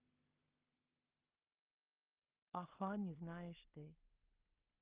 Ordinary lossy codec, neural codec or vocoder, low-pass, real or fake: Opus, 32 kbps; codec, 16 kHz in and 24 kHz out, 0.4 kbps, LongCat-Audio-Codec, two codebook decoder; 3.6 kHz; fake